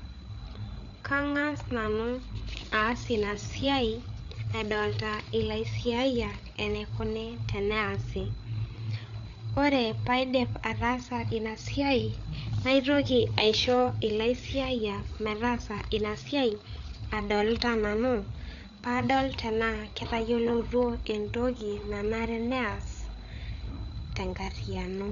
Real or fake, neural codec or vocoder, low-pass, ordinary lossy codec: fake; codec, 16 kHz, 8 kbps, FreqCodec, larger model; 7.2 kHz; none